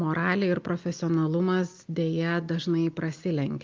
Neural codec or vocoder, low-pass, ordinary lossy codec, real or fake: none; 7.2 kHz; Opus, 24 kbps; real